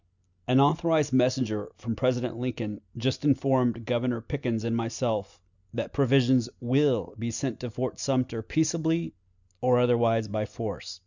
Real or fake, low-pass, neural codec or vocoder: real; 7.2 kHz; none